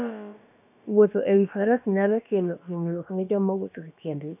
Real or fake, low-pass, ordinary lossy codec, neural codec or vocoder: fake; 3.6 kHz; none; codec, 16 kHz, about 1 kbps, DyCAST, with the encoder's durations